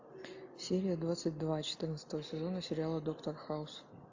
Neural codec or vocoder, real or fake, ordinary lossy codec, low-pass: none; real; AAC, 48 kbps; 7.2 kHz